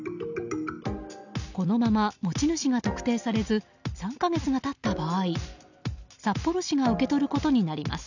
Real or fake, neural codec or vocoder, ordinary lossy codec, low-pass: real; none; none; 7.2 kHz